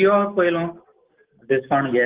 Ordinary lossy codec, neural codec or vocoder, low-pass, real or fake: Opus, 16 kbps; none; 3.6 kHz; real